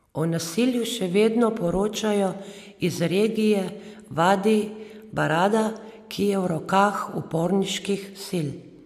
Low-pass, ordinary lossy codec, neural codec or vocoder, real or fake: 14.4 kHz; AAC, 96 kbps; none; real